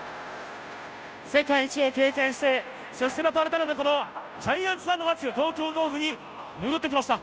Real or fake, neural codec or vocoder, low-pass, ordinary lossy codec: fake; codec, 16 kHz, 0.5 kbps, FunCodec, trained on Chinese and English, 25 frames a second; none; none